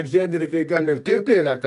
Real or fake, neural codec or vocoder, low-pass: fake; codec, 24 kHz, 0.9 kbps, WavTokenizer, medium music audio release; 10.8 kHz